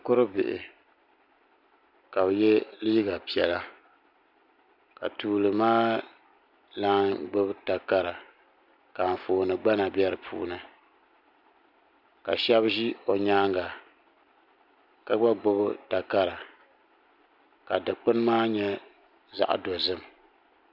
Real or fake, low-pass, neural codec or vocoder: real; 5.4 kHz; none